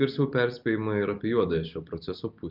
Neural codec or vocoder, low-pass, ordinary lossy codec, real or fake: none; 5.4 kHz; Opus, 24 kbps; real